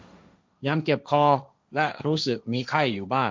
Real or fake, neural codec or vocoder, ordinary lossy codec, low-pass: fake; codec, 16 kHz, 1.1 kbps, Voila-Tokenizer; none; none